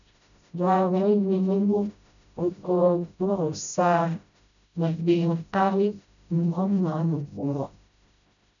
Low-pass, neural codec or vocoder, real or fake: 7.2 kHz; codec, 16 kHz, 0.5 kbps, FreqCodec, smaller model; fake